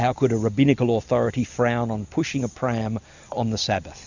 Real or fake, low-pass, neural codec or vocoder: real; 7.2 kHz; none